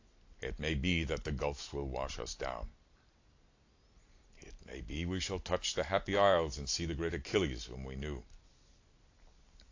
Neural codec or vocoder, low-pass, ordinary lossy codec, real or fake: none; 7.2 kHz; AAC, 48 kbps; real